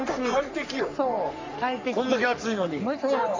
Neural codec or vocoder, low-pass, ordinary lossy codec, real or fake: codec, 44.1 kHz, 3.4 kbps, Pupu-Codec; 7.2 kHz; MP3, 64 kbps; fake